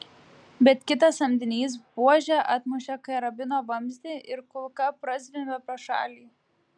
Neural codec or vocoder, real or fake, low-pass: none; real; 9.9 kHz